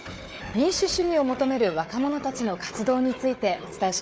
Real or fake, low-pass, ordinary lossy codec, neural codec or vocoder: fake; none; none; codec, 16 kHz, 4 kbps, FunCodec, trained on Chinese and English, 50 frames a second